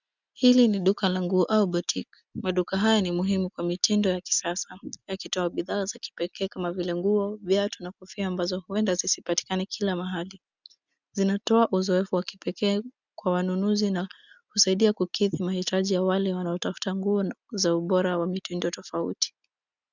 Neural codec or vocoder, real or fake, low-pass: none; real; 7.2 kHz